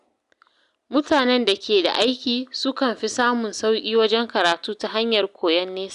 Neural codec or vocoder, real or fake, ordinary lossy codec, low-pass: none; real; Opus, 64 kbps; 10.8 kHz